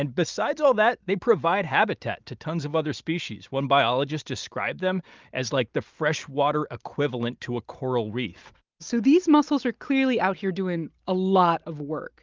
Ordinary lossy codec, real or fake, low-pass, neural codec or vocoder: Opus, 24 kbps; real; 7.2 kHz; none